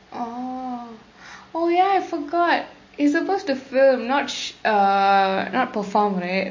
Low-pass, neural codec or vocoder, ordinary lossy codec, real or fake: 7.2 kHz; none; MP3, 32 kbps; real